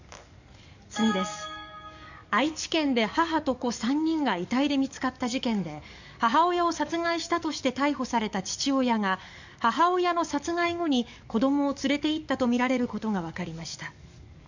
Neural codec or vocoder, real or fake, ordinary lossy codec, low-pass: codec, 44.1 kHz, 7.8 kbps, DAC; fake; none; 7.2 kHz